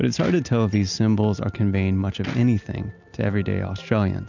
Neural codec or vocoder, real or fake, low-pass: none; real; 7.2 kHz